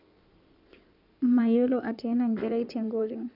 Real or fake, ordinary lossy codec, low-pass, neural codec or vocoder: fake; none; 5.4 kHz; codec, 16 kHz in and 24 kHz out, 2.2 kbps, FireRedTTS-2 codec